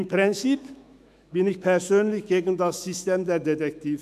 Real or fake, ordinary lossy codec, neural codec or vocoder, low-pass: fake; none; autoencoder, 48 kHz, 128 numbers a frame, DAC-VAE, trained on Japanese speech; 14.4 kHz